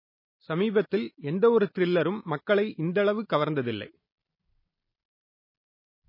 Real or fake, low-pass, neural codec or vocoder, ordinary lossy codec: real; 5.4 kHz; none; MP3, 24 kbps